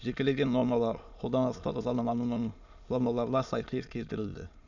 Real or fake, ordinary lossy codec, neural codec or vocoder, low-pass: fake; none; autoencoder, 22.05 kHz, a latent of 192 numbers a frame, VITS, trained on many speakers; 7.2 kHz